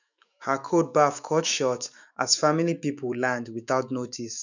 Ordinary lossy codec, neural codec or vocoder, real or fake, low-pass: none; autoencoder, 48 kHz, 128 numbers a frame, DAC-VAE, trained on Japanese speech; fake; 7.2 kHz